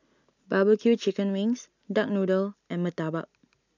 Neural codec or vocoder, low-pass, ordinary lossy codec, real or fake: none; 7.2 kHz; none; real